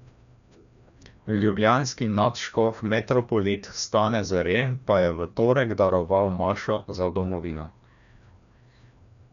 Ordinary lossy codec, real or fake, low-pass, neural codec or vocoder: none; fake; 7.2 kHz; codec, 16 kHz, 1 kbps, FreqCodec, larger model